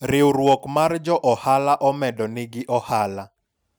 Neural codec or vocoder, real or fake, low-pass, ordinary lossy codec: none; real; none; none